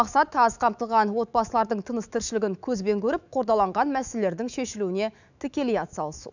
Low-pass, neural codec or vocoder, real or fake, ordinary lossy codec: 7.2 kHz; autoencoder, 48 kHz, 128 numbers a frame, DAC-VAE, trained on Japanese speech; fake; none